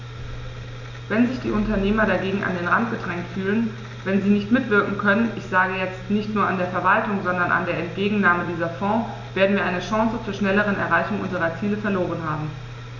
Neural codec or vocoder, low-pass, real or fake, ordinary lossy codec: none; 7.2 kHz; real; none